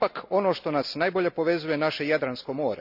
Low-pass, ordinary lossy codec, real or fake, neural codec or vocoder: 5.4 kHz; none; real; none